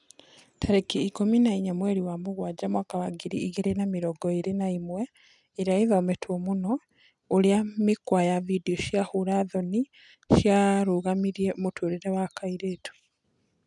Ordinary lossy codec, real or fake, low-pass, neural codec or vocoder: none; real; 10.8 kHz; none